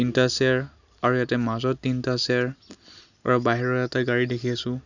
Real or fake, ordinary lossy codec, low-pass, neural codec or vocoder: real; none; 7.2 kHz; none